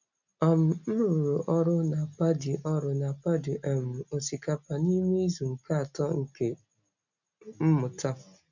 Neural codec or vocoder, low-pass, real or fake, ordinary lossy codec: none; 7.2 kHz; real; none